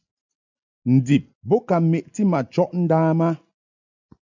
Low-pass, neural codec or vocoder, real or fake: 7.2 kHz; none; real